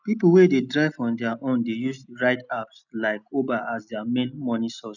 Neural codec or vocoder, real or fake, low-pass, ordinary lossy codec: none; real; 7.2 kHz; none